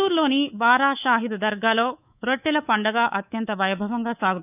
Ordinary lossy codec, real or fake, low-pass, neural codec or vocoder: none; fake; 3.6 kHz; codec, 24 kHz, 3.1 kbps, DualCodec